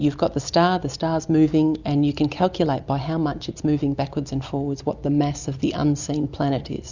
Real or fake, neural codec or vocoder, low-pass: real; none; 7.2 kHz